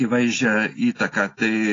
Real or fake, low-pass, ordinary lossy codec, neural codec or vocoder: real; 7.2 kHz; AAC, 32 kbps; none